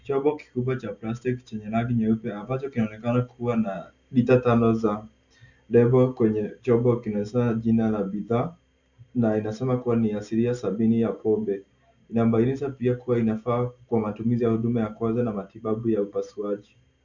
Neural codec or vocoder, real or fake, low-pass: none; real; 7.2 kHz